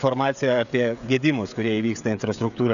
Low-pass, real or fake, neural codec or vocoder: 7.2 kHz; fake; codec, 16 kHz, 16 kbps, FreqCodec, smaller model